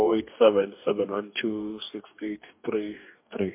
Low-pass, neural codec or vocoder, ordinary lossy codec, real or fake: 3.6 kHz; codec, 44.1 kHz, 2.6 kbps, DAC; MP3, 32 kbps; fake